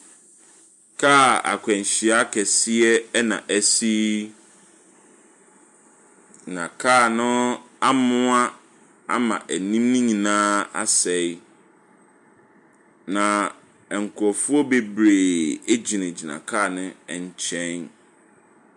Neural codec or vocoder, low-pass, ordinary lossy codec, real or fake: none; 10.8 kHz; MP3, 64 kbps; real